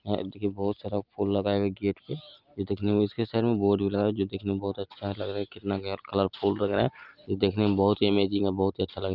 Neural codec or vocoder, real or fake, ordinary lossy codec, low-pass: none; real; Opus, 24 kbps; 5.4 kHz